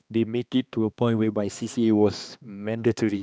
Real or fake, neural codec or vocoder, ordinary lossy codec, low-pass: fake; codec, 16 kHz, 2 kbps, X-Codec, HuBERT features, trained on balanced general audio; none; none